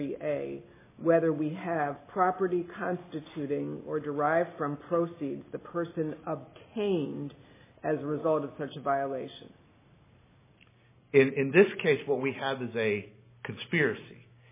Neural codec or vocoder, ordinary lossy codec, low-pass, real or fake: none; MP3, 16 kbps; 3.6 kHz; real